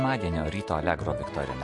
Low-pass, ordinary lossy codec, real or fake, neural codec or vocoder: 10.8 kHz; MP3, 48 kbps; real; none